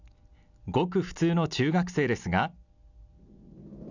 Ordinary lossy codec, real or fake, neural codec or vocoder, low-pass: none; real; none; 7.2 kHz